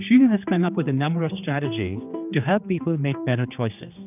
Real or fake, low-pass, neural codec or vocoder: fake; 3.6 kHz; codec, 16 kHz, 2 kbps, X-Codec, HuBERT features, trained on general audio